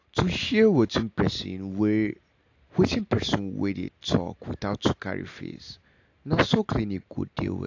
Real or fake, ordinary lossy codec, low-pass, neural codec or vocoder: real; AAC, 48 kbps; 7.2 kHz; none